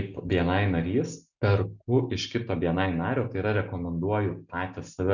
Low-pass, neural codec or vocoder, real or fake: 7.2 kHz; none; real